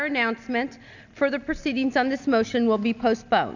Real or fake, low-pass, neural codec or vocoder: real; 7.2 kHz; none